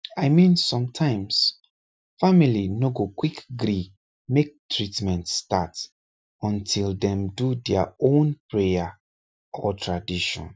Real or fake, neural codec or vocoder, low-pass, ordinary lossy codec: real; none; none; none